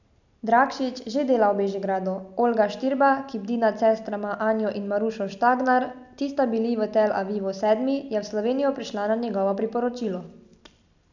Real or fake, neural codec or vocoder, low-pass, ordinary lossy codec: real; none; 7.2 kHz; none